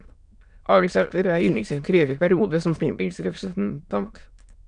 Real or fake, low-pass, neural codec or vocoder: fake; 9.9 kHz; autoencoder, 22.05 kHz, a latent of 192 numbers a frame, VITS, trained on many speakers